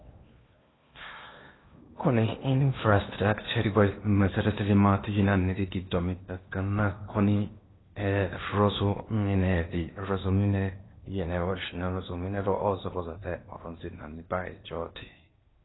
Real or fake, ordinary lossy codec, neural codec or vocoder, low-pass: fake; AAC, 16 kbps; codec, 16 kHz in and 24 kHz out, 0.8 kbps, FocalCodec, streaming, 65536 codes; 7.2 kHz